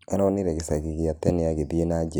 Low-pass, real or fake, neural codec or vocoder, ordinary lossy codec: none; real; none; none